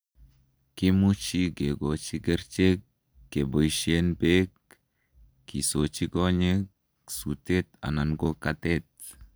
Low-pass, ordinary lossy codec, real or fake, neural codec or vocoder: none; none; real; none